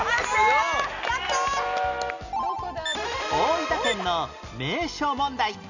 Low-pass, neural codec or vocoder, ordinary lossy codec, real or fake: 7.2 kHz; none; none; real